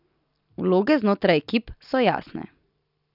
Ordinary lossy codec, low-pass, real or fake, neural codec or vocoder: none; 5.4 kHz; real; none